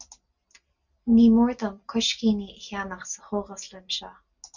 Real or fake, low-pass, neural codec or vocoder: real; 7.2 kHz; none